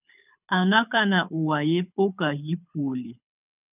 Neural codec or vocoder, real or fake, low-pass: codec, 24 kHz, 6 kbps, HILCodec; fake; 3.6 kHz